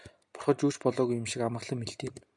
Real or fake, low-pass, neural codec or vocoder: real; 10.8 kHz; none